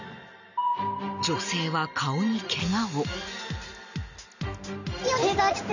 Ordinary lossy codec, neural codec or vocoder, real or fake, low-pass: none; none; real; 7.2 kHz